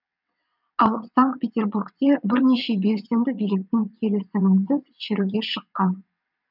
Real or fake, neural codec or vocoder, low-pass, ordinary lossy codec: fake; vocoder, 22.05 kHz, 80 mel bands, WaveNeXt; 5.4 kHz; none